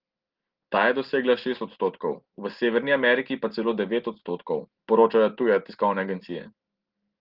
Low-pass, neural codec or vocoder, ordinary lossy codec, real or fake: 5.4 kHz; none; Opus, 16 kbps; real